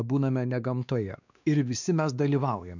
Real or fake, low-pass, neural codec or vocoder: fake; 7.2 kHz; codec, 16 kHz, 2 kbps, X-Codec, WavLM features, trained on Multilingual LibriSpeech